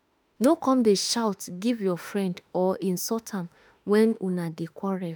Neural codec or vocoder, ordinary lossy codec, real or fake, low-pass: autoencoder, 48 kHz, 32 numbers a frame, DAC-VAE, trained on Japanese speech; none; fake; none